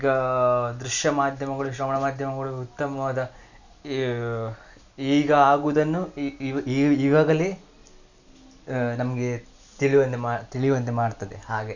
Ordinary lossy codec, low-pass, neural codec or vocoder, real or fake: none; 7.2 kHz; none; real